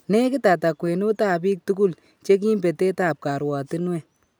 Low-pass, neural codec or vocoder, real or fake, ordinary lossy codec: none; none; real; none